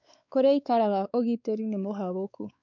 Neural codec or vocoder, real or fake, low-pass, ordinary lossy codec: codec, 16 kHz, 4 kbps, X-Codec, WavLM features, trained on Multilingual LibriSpeech; fake; 7.2 kHz; none